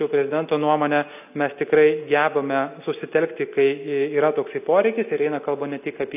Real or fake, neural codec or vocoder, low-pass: real; none; 3.6 kHz